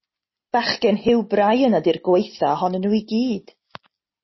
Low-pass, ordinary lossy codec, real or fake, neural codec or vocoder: 7.2 kHz; MP3, 24 kbps; real; none